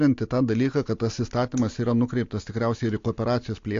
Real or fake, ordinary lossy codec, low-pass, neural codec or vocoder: real; AAC, 48 kbps; 7.2 kHz; none